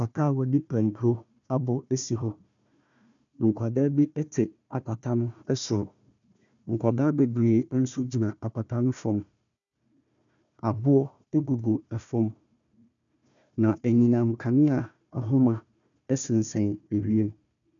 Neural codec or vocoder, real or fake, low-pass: codec, 16 kHz, 1 kbps, FunCodec, trained on Chinese and English, 50 frames a second; fake; 7.2 kHz